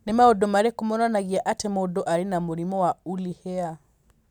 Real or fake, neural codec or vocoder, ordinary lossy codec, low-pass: real; none; none; 19.8 kHz